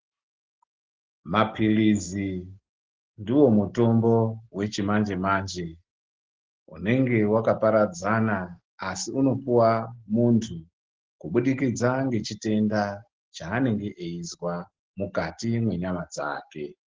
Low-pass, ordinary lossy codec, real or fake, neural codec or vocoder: 7.2 kHz; Opus, 16 kbps; real; none